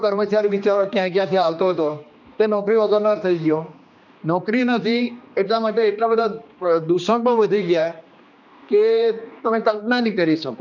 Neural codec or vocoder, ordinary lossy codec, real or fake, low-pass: codec, 16 kHz, 2 kbps, X-Codec, HuBERT features, trained on general audio; none; fake; 7.2 kHz